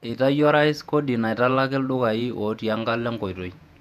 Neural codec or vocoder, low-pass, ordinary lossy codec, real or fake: vocoder, 48 kHz, 128 mel bands, Vocos; 14.4 kHz; none; fake